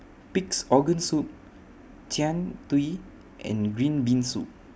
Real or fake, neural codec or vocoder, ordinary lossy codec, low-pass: real; none; none; none